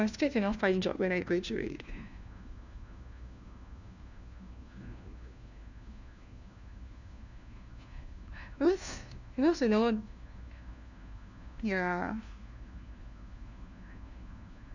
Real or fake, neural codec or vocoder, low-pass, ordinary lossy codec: fake; codec, 16 kHz, 1 kbps, FunCodec, trained on LibriTTS, 50 frames a second; 7.2 kHz; none